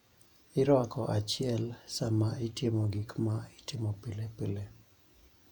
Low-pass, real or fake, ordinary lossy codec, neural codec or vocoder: 19.8 kHz; fake; none; vocoder, 48 kHz, 128 mel bands, Vocos